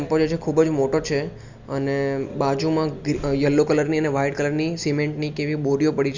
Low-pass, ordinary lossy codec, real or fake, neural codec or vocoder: 7.2 kHz; Opus, 64 kbps; real; none